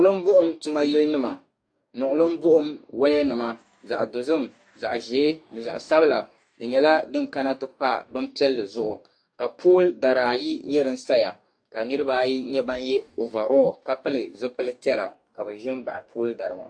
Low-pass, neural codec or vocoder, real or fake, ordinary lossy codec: 9.9 kHz; codec, 44.1 kHz, 2.6 kbps, DAC; fake; AAC, 64 kbps